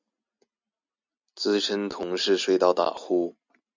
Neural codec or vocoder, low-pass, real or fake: none; 7.2 kHz; real